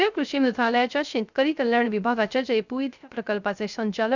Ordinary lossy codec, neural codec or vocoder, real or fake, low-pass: none; codec, 16 kHz, 0.3 kbps, FocalCodec; fake; 7.2 kHz